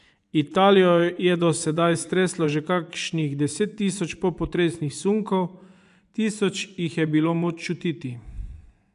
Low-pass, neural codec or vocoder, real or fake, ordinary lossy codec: 10.8 kHz; vocoder, 24 kHz, 100 mel bands, Vocos; fake; none